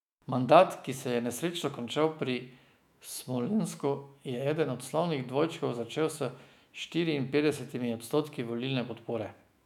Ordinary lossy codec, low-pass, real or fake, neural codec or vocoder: none; 19.8 kHz; fake; autoencoder, 48 kHz, 128 numbers a frame, DAC-VAE, trained on Japanese speech